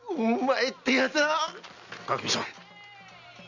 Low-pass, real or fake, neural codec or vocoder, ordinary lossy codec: 7.2 kHz; real; none; none